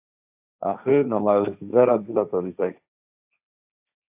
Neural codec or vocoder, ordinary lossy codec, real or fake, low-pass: codec, 16 kHz, 1.1 kbps, Voila-Tokenizer; AAC, 32 kbps; fake; 3.6 kHz